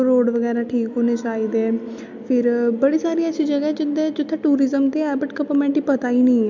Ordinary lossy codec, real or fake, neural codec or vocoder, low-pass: MP3, 64 kbps; real; none; 7.2 kHz